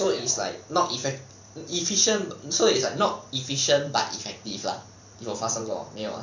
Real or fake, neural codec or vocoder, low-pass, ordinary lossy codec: fake; vocoder, 44.1 kHz, 128 mel bands every 512 samples, BigVGAN v2; 7.2 kHz; none